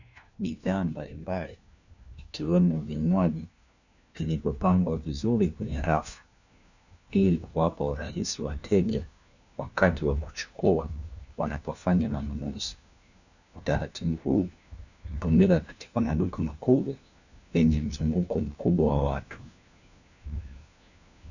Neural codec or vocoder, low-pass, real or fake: codec, 16 kHz, 1 kbps, FunCodec, trained on LibriTTS, 50 frames a second; 7.2 kHz; fake